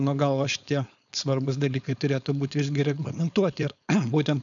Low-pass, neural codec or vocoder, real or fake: 7.2 kHz; codec, 16 kHz, 4.8 kbps, FACodec; fake